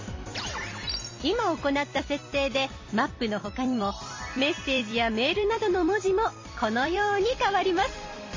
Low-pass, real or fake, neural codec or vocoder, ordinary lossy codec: 7.2 kHz; fake; vocoder, 44.1 kHz, 128 mel bands every 256 samples, BigVGAN v2; MP3, 32 kbps